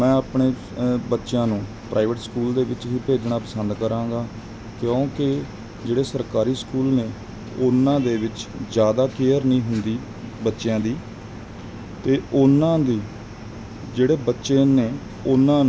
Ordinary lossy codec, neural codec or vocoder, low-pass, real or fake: none; none; none; real